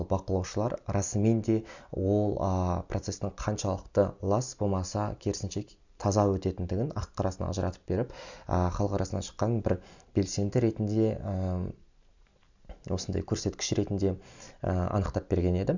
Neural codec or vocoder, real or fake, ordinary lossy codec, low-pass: none; real; none; 7.2 kHz